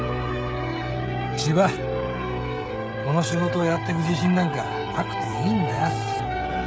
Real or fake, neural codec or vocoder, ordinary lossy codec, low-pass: fake; codec, 16 kHz, 16 kbps, FreqCodec, smaller model; none; none